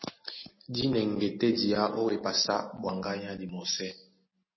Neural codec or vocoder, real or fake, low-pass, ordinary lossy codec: none; real; 7.2 kHz; MP3, 24 kbps